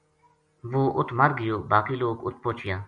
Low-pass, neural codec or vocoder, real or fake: 9.9 kHz; none; real